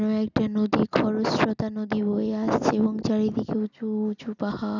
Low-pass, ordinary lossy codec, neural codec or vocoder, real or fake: 7.2 kHz; none; none; real